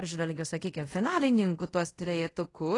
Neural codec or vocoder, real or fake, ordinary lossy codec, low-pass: codec, 24 kHz, 0.5 kbps, DualCodec; fake; AAC, 32 kbps; 10.8 kHz